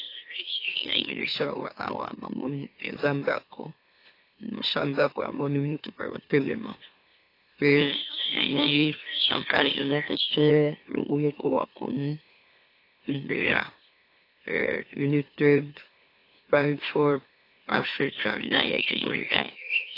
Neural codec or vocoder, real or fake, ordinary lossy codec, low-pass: autoencoder, 44.1 kHz, a latent of 192 numbers a frame, MeloTTS; fake; AAC, 32 kbps; 5.4 kHz